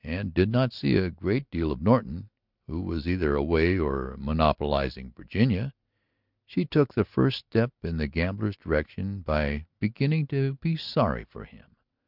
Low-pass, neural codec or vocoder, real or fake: 5.4 kHz; none; real